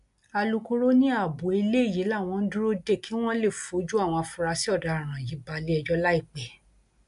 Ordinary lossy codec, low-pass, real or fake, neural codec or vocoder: AAC, 96 kbps; 10.8 kHz; real; none